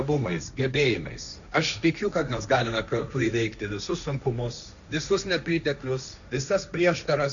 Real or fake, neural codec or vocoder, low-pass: fake; codec, 16 kHz, 1.1 kbps, Voila-Tokenizer; 7.2 kHz